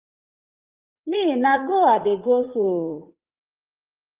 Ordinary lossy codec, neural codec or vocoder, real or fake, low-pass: Opus, 32 kbps; vocoder, 44.1 kHz, 80 mel bands, Vocos; fake; 3.6 kHz